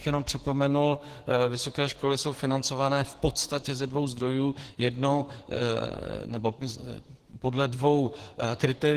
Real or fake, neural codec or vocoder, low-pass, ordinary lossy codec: fake; codec, 44.1 kHz, 2.6 kbps, SNAC; 14.4 kHz; Opus, 16 kbps